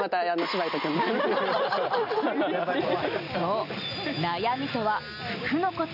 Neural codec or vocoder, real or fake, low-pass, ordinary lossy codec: none; real; 5.4 kHz; none